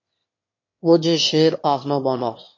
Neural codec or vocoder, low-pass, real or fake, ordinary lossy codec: autoencoder, 22.05 kHz, a latent of 192 numbers a frame, VITS, trained on one speaker; 7.2 kHz; fake; MP3, 32 kbps